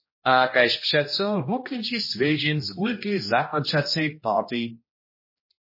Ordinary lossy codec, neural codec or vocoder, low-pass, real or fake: MP3, 24 kbps; codec, 16 kHz, 1 kbps, X-Codec, HuBERT features, trained on general audio; 5.4 kHz; fake